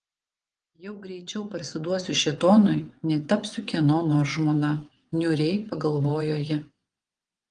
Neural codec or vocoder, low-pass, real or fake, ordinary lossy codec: vocoder, 22.05 kHz, 80 mel bands, WaveNeXt; 9.9 kHz; fake; Opus, 24 kbps